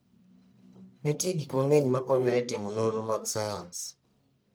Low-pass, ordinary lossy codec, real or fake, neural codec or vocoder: none; none; fake; codec, 44.1 kHz, 1.7 kbps, Pupu-Codec